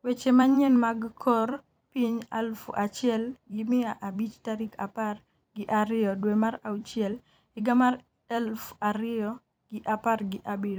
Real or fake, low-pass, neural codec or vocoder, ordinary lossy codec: fake; none; vocoder, 44.1 kHz, 128 mel bands every 256 samples, BigVGAN v2; none